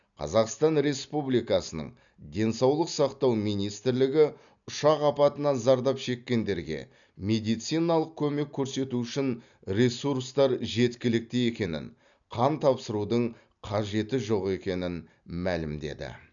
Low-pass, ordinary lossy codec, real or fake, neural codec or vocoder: 7.2 kHz; none; real; none